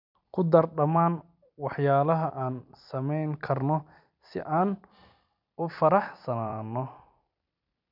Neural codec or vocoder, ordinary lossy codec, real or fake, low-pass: vocoder, 44.1 kHz, 128 mel bands every 256 samples, BigVGAN v2; none; fake; 5.4 kHz